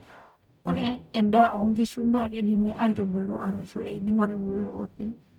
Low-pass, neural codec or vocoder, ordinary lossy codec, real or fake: 19.8 kHz; codec, 44.1 kHz, 0.9 kbps, DAC; none; fake